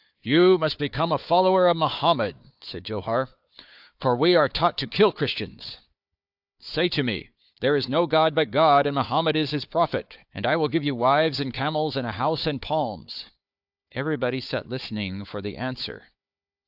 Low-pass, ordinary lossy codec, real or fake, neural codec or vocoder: 5.4 kHz; AAC, 48 kbps; fake; codec, 16 kHz, 4 kbps, FunCodec, trained on Chinese and English, 50 frames a second